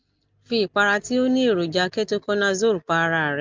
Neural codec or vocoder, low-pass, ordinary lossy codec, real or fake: none; 7.2 kHz; Opus, 32 kbps; real